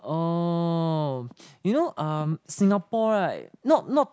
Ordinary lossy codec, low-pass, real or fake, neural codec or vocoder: none; none; real; none